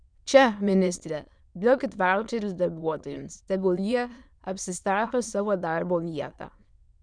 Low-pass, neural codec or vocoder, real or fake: 9.9 kHz; autoencoder, 22.05 kHz, a latent of 192 numbers a frame, VITS, trained on many speakers; fake